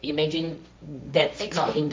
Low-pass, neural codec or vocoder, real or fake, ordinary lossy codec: none; codec, 16 kHz, 1.1 kbps, Voila-Tokenizer; fake; none